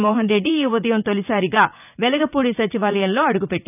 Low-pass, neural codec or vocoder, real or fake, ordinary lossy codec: 3.6 kHz; vocoder, 44.1 kHz, 80 mel bands, Vocos; fake; none